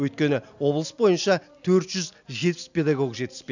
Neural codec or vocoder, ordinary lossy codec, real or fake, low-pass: none; none; real; 7.2 kHz